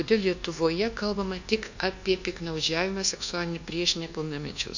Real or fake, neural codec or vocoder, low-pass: fake; codec, 24 kHz, 1.2 kbps, DualCodec; 7.2 kHz